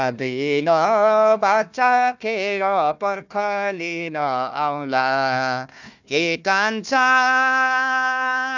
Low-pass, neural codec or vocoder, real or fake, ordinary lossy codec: 7.2 kHz; codec, 16 kHz, 1 kbps, FunCodec, trained on Chinese and English, 50 frames a second; fake; none